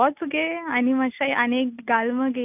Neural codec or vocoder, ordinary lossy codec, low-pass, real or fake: none; none; 3.6 kHz; real